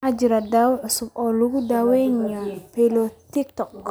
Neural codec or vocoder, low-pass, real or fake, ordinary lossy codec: none; none; real; none